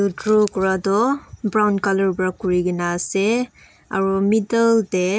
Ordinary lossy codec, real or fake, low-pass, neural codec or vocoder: none; real; none; none